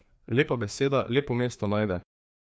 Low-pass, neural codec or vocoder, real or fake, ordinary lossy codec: none; codec, 16 kHz, 2 kbps, FreqCodec, larger model; fake; none